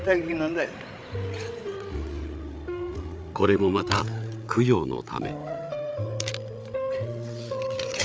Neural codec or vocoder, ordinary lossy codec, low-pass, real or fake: codec, 16 kHz, 8 kbps, FreqCodec, larger model; none; none; fake